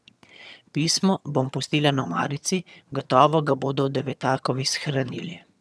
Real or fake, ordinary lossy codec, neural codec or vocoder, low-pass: fake; none; vocoder, 22.05 kHz, 80 mel bands, HiFi-GAN; none